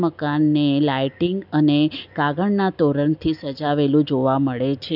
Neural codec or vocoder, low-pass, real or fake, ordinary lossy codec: none; 5.4 kHz; real; none